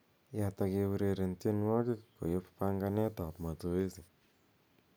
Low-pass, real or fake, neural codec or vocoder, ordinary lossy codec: none; real; none; none